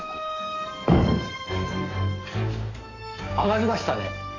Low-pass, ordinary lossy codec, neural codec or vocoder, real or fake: 7.2 kHz; AAC, 32 kbps; codec, 44.1 kHz, 7.8 kbps, DAC; fake